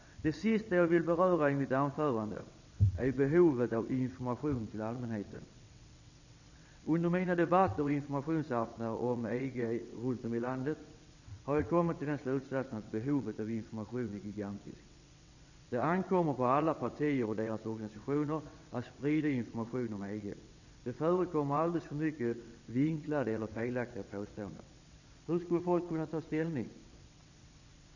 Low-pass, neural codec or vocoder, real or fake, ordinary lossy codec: 7.2 kHz; vocoder, 22.05 kHz, 80 mel bands, WaveNeXt; fake; none